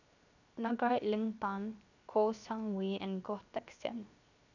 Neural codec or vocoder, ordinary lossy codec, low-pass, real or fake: codec, 16 kHz, 0.7 kbps, FocalCodec; none; 7.2 kHz; fake